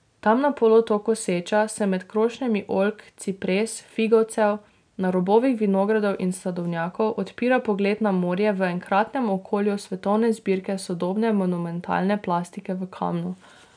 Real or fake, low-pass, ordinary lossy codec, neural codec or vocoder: real; 9.9 kHz; none; none